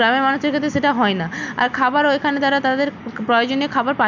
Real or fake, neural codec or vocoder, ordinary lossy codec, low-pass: real; none; none; 7.2 kHz